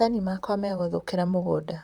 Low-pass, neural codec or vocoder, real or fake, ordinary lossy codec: 19.8 kHz; vocoder, 44.1 kHz, 128 mel bands, Pupu-Vocoder; fake; Opus, 64 kbps